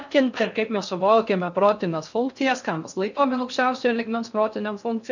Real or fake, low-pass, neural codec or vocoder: fake; 7.2 kHz; codec, 16 kHz in and 24 kHz out, 0.6 kbps, FocalCodec, streaming, 4096 codes